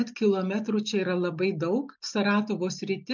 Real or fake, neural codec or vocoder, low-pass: real; none; 7.2 kHz